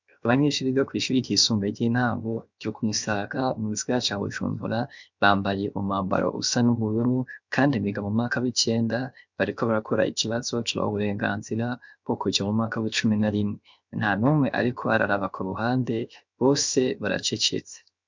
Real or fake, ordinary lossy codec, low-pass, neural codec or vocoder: fake; MP3, 64 kbps; 7.2 kHz; codec, 16 kHz, 0.7 kbps, FocalCodec